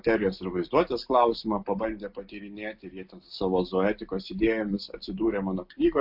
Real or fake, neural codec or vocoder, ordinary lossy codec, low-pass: real; none; AAC, 48 kbps; 5.4 kHz